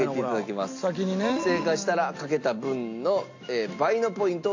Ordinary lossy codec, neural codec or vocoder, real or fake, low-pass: AAC, 48 kbps; none; real; 7.2 kHz